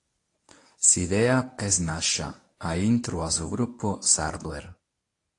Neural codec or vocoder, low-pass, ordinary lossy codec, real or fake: codec, 24 kHz, 0.9 kbps, WavTokenizer, medium speech release version 1; 10.8 kHz; AAC, 48 kbps; fake